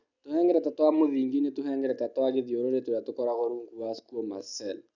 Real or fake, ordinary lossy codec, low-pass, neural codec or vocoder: real; none; 7.2 kHz; none